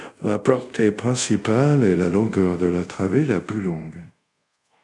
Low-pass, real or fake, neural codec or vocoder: 10.8 kHz; fake; codec, 24 kHz, 0.5 kbps, DualCodec